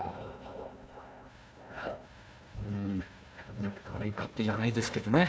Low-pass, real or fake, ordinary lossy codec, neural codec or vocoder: none; fake; none; codec, 16 kHz, 1 kbps, FunCodec, trained on Chinese and English, 50 frames a second